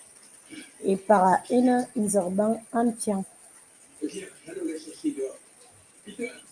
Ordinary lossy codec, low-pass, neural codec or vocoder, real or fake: Opus, 32 kbps; 9.9 kHz; none; real